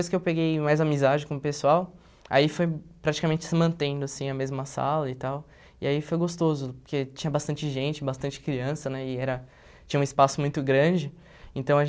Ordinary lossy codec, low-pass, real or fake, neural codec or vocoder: none; none; real; none